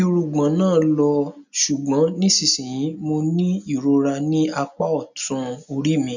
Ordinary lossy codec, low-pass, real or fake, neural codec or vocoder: none; 7.2 kHz; real; none